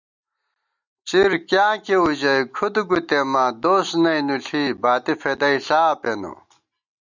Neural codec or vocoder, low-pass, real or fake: none; 7.2 kHz; real